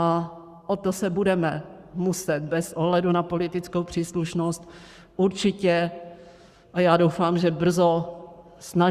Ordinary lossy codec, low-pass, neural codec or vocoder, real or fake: Opus, 64 kbps; 14.4 kHz; codec, 44.1 kHz, 7.8 kbps, Pupu-Codec; fake